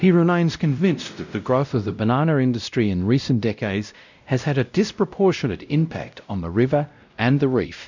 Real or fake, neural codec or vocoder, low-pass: fake; codec, 16 kHz, 0.5 kbps, X-Codec, WavLM features, trained on Multilingual LibriSpeech; 7.2 kHz